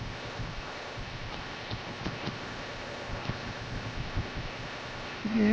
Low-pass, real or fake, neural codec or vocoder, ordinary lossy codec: none; fake; codec, 16 kHz, 0.7 kbps, FocalCodec; none